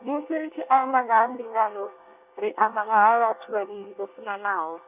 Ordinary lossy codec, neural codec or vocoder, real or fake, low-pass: none; codec, 24 kHz, 1 kbps, SNAC; fake; 3.6 kHz